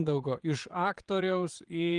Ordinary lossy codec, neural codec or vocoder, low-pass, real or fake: Opus, 24 kbps; vocoder, 22.05 kHz, 80 mel bands, Vocos; 9.9 kHz; fake